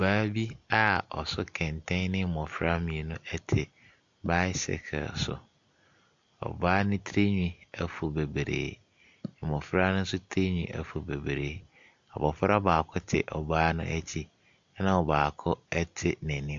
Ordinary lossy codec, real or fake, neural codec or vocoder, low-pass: AAC, 64 kbps; real; none; 7.2 kHz